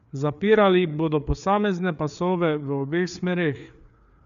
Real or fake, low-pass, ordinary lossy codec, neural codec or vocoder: fake; 7.2 kHz; none; codec, 16 kHz, 4 kbps, FreqCodec, larger model